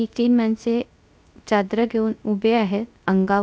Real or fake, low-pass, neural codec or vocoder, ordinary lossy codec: fake; none; codec, 16 kHz, 0.3 kbps, FocalCodec; none